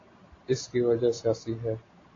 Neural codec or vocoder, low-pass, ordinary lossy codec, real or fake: none; 7.2 kHz; AAC, 32 kbps; real